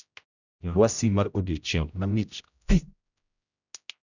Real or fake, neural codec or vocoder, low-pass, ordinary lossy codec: fake; codec, 16 kHz, 0.5 kbps, X-Codec, HuBERT features, trained on general audio; 7.2 kHz; none